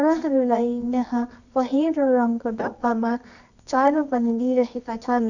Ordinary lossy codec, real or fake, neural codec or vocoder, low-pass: none; fake; codec, 24 kHz, 0.9 kbps, WavTokenizer, medium music audio release; 7.2 kHz